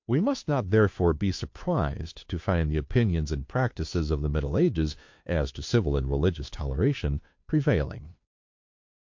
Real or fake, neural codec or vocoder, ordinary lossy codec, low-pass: fake; codec, 16 kHz, 2 kbps, FunCodec, trained on Chinese and English, 25 frames a second; MP3, 48 kbps; 7.2 kHz